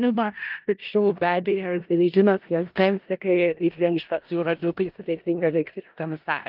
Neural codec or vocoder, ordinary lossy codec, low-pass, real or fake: codec, 16 kHz in and 24 kHz out, 0.4 kbps, LongCat-Audio-Codec, four codebook decoder; Opus, 32 kbps; 5.4 kHz; fake